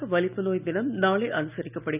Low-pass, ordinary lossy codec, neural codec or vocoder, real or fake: 3.6 kHz; none; none; real